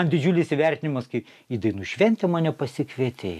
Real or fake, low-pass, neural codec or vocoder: real; 14.4 kHz; none